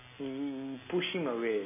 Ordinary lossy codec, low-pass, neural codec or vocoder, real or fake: MP3, 16 kbps; 3.6 kHz; none; real